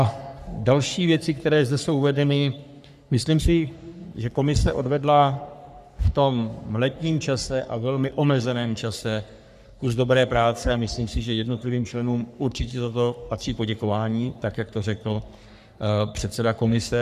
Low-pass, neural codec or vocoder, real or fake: 14.4 kHz; codec, 44.1 kHz, 3.4 kbps, Pupu-Codec; fake